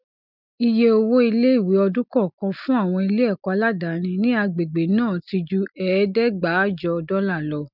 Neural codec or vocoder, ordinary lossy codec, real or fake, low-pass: none; none; real; 5.4 kHz